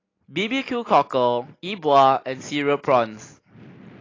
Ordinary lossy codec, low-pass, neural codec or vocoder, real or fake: AAC, 32 kbps; 7.2 kHz; none; real